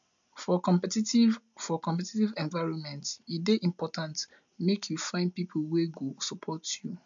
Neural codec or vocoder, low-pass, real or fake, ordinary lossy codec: none; 7.2 kHz; real; MP3, 64 kbps